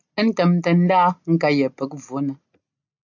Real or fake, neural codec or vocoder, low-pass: real; none; 7.2 kHz